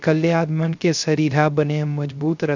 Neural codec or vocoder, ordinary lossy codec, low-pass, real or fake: codec, 16 kHz, 0.3 kbps, FocalCodec; none; 7.2 kHz; fake